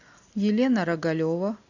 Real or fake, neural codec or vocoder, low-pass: real; none; 7.2 kHz